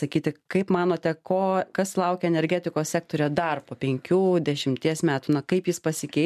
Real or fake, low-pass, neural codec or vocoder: real; 14.4 kHz; none